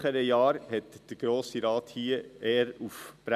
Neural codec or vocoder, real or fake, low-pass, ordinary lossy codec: none; real; 14.4 kHz; none